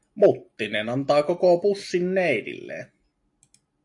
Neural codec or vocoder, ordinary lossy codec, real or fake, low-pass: none; AAC, 64 kbps; real; 10.8 kHz